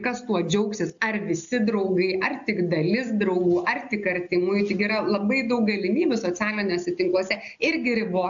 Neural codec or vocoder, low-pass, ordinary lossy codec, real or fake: none; 7.2 kHz; AAC, 64 kbps; real